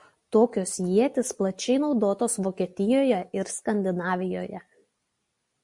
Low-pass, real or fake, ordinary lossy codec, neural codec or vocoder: 10.8 kHz; real; MP3, 64 kbps; none